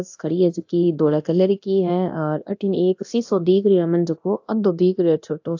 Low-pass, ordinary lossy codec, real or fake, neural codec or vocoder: 7.2 kHz; AAC, 48 kbps; fake; codec, 24 kHz, 0.9 kbps, DualCodec